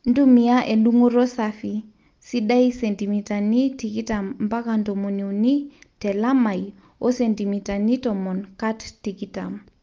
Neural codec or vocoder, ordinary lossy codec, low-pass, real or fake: none; Opus, 24 kbps; 7.2 kHz; real